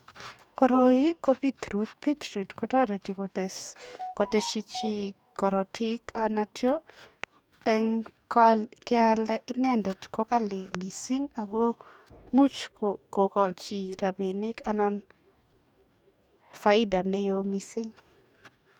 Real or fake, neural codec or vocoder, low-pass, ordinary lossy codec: fake; codec, 44.1 kHz, 2.6 kbps, DAC; 19.8 kHz; none